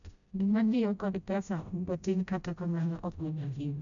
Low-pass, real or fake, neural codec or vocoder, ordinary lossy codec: 7.2 kHz; fake; codec, 16 kHz, 0.5 kbps, FreqCodec, smaller model; none